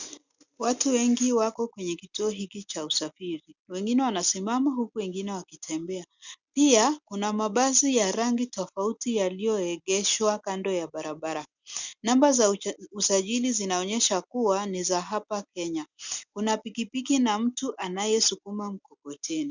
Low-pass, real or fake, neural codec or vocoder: 7.2 kHz; real; none